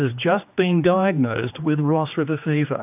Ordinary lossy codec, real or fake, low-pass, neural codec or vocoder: AAC, 32 kbps; fake; 3.6 kHz; codec, 16 kHz, 2 kbps, X-Codec, HuBERT features, trained on general audio